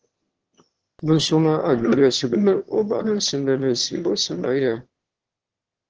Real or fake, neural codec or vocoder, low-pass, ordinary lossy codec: fake; autoencoder, 22.05 kHz, a latent of 192 numbers a frame, VITS, trained on one speaker; 7.2 kHz; Opus, 16 kbps